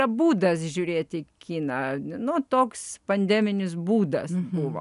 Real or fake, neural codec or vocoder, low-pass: real; none; 10.8 kHz